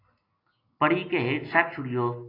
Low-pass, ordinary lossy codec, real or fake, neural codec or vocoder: 5.4 kHz; AAC, 24 kbps; real; none